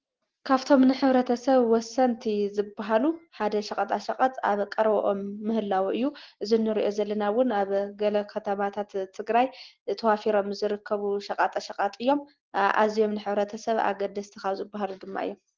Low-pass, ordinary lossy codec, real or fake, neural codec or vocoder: 7.2 kHz; Opus, 16 kbps; real; none